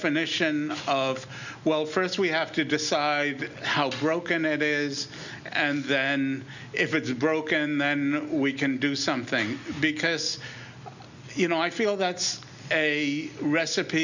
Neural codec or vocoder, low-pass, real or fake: none; 7.2 kHz; real